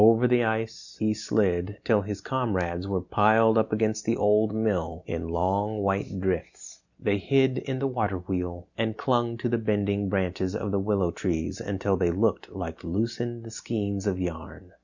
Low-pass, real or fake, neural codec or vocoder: 7.2 kHz; real; none